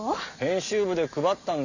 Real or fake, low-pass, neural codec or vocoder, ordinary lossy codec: real; 7.2 kHz; none; none